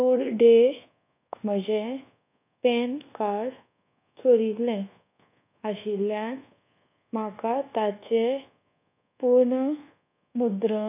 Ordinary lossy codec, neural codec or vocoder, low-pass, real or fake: none; codec, 24 kHz, 1.2 kbps, DualCodec; 3.6 kHz; fake